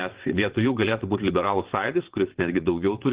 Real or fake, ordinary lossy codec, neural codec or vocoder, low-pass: fake; Opus, 16 kbps; vocoder, 22.05 kHz, 80 mel bands, WaveNeXt; 3.6 kHz